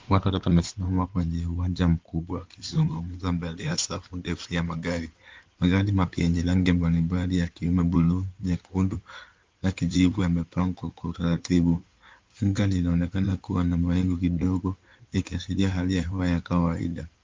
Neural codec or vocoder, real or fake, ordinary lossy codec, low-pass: codec, 16 kHz in and 24 kHz out, 2.2 kbps, FireRedTTS-2 codec; fake; Opus, 32 kbps; 7.2 kHz